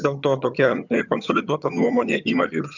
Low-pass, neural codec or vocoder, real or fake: 7.2 kHz; vocoder, 22.05 kHz, 80 mel bands, HiFi-GAN; fake